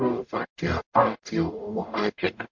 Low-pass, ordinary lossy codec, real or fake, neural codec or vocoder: 7.2 kHz; MP3, 64 kbps; fake; codec, 44.1 kHz, 0.9 kbps, DAC